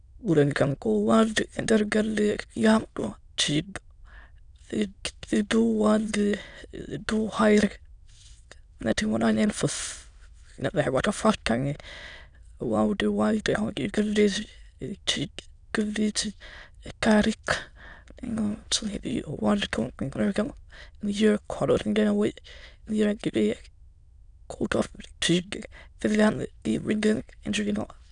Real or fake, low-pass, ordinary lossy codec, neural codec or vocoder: fake; 9.9 kHz; none; autoencoder, 22.05 kHz, a latent of 192 numbers a frame, VITS, trained on many speakers